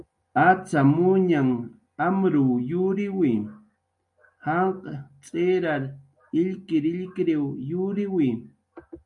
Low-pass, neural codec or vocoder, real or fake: 10.8 kHz; none; real